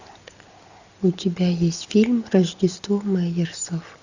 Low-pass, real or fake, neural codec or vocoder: 7.2 kHz; real; none